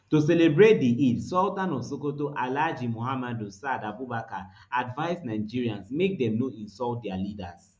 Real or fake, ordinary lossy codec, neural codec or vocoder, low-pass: real; none; none; none